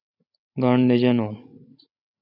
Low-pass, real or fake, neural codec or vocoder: 5.4 kHz; fake; codec, 16 kHz, 16 kbps, FreqCodec, larger model